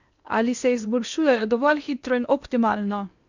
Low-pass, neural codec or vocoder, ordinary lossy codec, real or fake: 7.2 kHz; codec, 16 kHz in and 24 kHz out, 0.8 kbps, FocalCodec, streaming, 65536 codes; none; fake